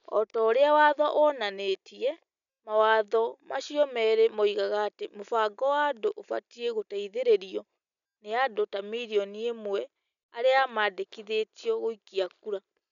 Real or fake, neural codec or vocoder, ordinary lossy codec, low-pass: real; none; none; 7.2 kHz